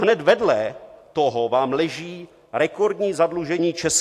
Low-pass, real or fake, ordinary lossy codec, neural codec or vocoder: 14.4 kHz; real; AAC, 64 kbps; none